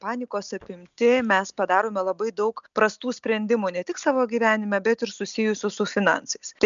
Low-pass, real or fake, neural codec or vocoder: 7.2 kHz; real; none